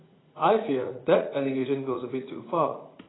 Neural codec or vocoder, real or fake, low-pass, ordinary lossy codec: vocoder, 44.1 kHz, 80 mel bands, Vocos; fake; 7.2 kHz; AAC, 16 kbps